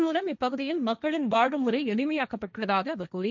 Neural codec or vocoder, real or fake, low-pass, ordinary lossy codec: codec, 16 kHz, 1.1 kbps, Voila-Tokenizer; fake; 7.2 kHz; none